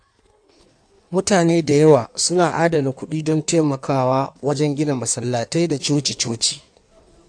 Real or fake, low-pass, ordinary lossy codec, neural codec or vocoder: fake; 9.9 kHz; AAC, 64 kbps; codec, 16 kHz in and 24 kHz out, 1.1 kbps, FireRedTTS-2 codec